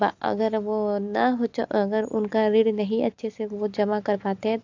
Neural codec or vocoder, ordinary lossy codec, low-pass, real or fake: none; AAC, 48 kbps; 7.2 kHz; real